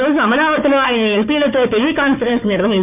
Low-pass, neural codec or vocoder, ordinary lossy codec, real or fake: 3.6 kHz; codec, 16 kHz, 4 kbps, FunCodec, trained on Chinese and English, 50 frames a second; none; fake